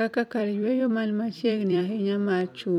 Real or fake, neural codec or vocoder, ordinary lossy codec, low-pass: fake; vocoder, 44.1 kHz, 128 mel bands every 256 samples, BigVGAN v2; none; 19.8 kHz